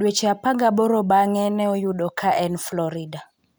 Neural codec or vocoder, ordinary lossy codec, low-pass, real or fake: none; none; none; real